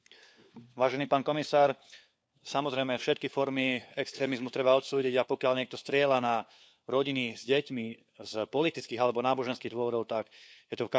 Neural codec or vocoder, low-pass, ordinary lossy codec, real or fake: codec, 16 kHz, 4 kbps, FunCodec, trained on LibriTTS, 50 frames a second; none; none; fake